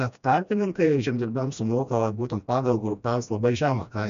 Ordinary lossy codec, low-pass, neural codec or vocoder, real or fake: MP3, 96 kbps; 7.2 kHz; codec, 16 kHz, 1 kbps, FreqCodec, smaller model; fake